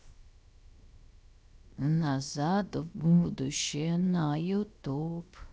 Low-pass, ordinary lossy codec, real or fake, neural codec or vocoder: none; none; fake; codec, 16 kHz, 0.7 kbps, FocalCodec